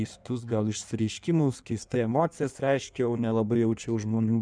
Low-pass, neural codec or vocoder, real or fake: 9.9 kHz; codec, 16 kHz in and 24 kHz out, 1.1 kbps, FireRedTTS-2 codec; fake